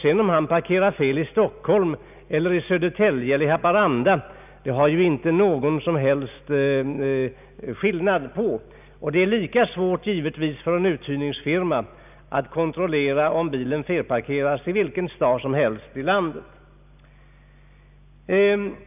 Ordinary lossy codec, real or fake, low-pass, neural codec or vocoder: none; real; 3.6 kHz; none